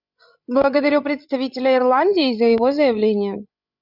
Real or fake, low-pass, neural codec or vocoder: fake; 5.4 kHz; codec, 16 kHz, 16 kbps, FreqCodec, larger model